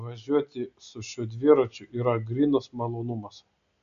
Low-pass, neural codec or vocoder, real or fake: 7.2 kHz; none; real